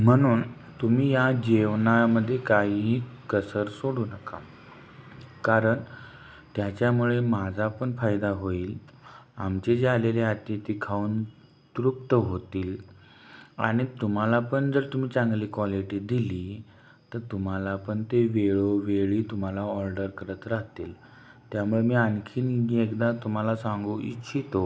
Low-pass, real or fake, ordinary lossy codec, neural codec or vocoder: none; real; none; none